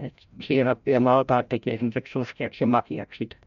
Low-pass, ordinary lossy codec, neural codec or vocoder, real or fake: 7.2 kHz; none; codec, 16 kHz, 0.5 kbps, FreqCodec, larger model; fake